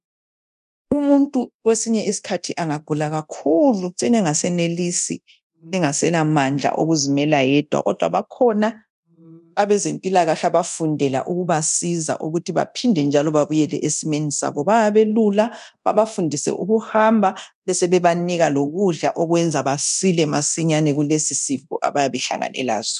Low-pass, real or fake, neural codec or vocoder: 10.8 kHz; fake; codec, 24 kHz, 0.9 kbps, DualCodec